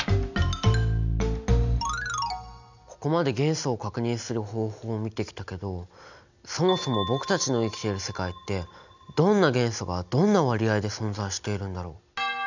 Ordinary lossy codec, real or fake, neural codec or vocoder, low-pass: none; real; none; 7.2 kHz